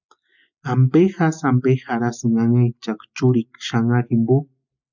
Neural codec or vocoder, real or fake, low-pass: none; real; 7.2 kHz